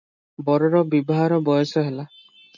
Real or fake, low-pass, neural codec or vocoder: real; 7.2 kHz; none